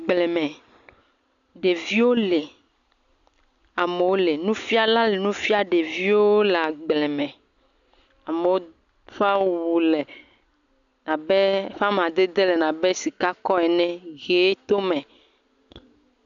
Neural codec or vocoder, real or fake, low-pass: none; real; 7.2 kHz